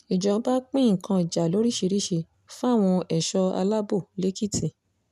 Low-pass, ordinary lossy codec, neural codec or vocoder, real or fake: 14.4 kHz; none; none; real